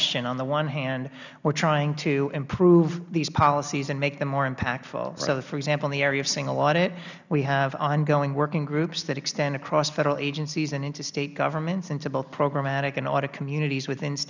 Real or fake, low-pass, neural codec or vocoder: real; 7.2 kHz; none